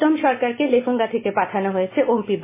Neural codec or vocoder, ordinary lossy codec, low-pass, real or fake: none; MP3, 16 kbps; 3.6 kHz; real